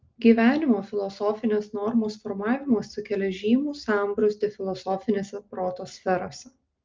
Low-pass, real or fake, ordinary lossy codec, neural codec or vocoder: 7.2 kHz; real; Opus, 24 kbps; none